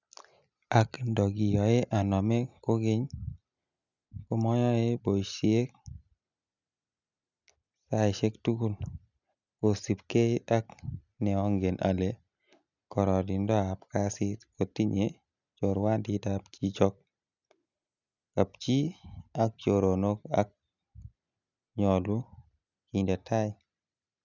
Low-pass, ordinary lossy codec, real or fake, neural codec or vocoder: 7.2 kHz; none; real; none